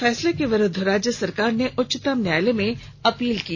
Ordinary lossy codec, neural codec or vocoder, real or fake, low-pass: none; none; real; none